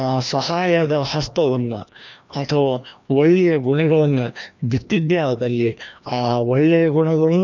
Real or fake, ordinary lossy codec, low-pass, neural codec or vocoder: fake; none; 7.2 kHz; codec, 16 kHz, 1 kbps, FreqCodec, larger model